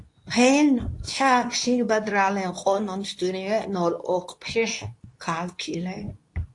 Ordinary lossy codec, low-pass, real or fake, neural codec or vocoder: AAC, 48 kbps; 10.8 kHz; fake; codec, 24 kHz, 0.9 kbps, WavTokenizer, medium speech release version 2